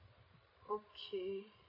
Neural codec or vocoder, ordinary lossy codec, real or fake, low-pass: codec, 16 kHz, 16 kbps, FreqCodec, larger model; MP3, 24 kbps; fake; 5.4 kHz